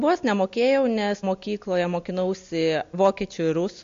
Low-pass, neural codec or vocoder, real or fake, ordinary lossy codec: 7.2 kHz; none; real; MP3, 48 kbps